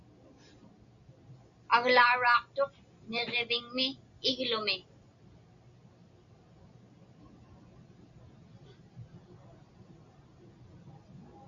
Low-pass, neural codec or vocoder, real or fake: 7.2 kHz; none; real